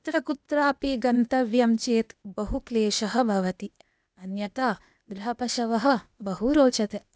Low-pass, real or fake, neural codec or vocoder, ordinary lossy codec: none; fake; codec, 16 kHz, 0.8 kbps, ZipCodec; none